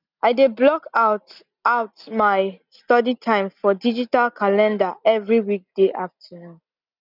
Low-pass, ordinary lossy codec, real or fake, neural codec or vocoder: 5.4 kHz; none; real; none